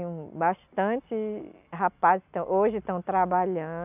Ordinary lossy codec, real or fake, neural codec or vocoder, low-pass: none; real; none; 3.6 kHz